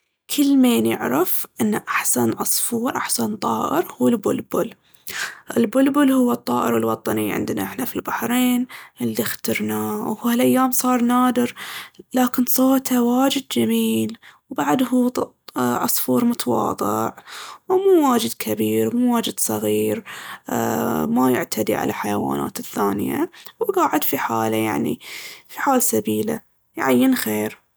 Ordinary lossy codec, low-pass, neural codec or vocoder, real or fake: none; none; none; real